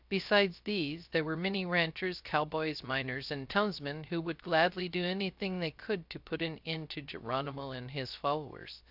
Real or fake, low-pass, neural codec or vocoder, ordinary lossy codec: fake; 5.4 kHz; codec, 16 kHz, 0.3 kbps, FocalCodec; AAC, 48 kbps